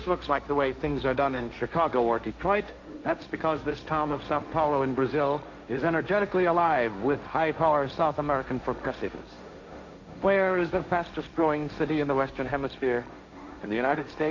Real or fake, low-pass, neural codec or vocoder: fake; 7.2 kHz; codec, 16 kHz, 1.1 kbps, Voila-Tokenizer